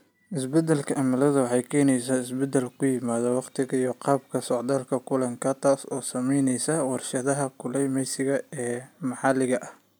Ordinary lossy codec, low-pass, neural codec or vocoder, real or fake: none; none; none; real